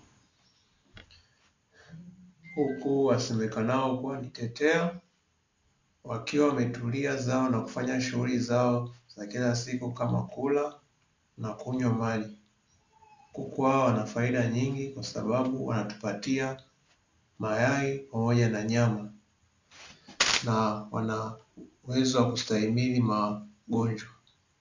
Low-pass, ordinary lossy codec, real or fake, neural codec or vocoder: 7.2 kHz; MP3, 64 kbps; real; none